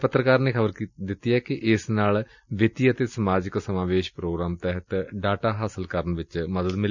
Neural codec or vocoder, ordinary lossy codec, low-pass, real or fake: none; none; 7.2 kHz; real